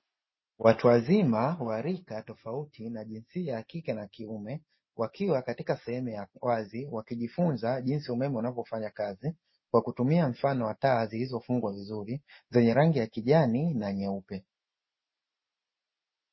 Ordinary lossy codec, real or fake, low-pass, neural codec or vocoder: MP3, 24 kbps; real; 7.2 kHz; none